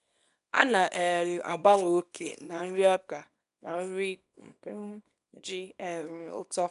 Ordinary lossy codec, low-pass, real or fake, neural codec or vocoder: none; 10.8 kHz; fake; codec, 24 kHz, 0.9 kbps, WavTokenizer, small release